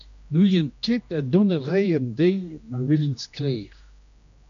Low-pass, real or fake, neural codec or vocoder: 7.2 kHz; fake; codec, 16 kHz, 1 kbps, X-Codec, HuBERT features, trained on general audio